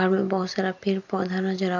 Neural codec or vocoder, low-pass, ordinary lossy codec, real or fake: none; 7.2 kHz; none; real